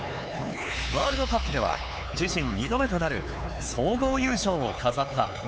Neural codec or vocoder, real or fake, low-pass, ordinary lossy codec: codec, 16 kHz, 4 kbps, X-Codec, HuBERT features, trained on LibriSpeech; fake; none; none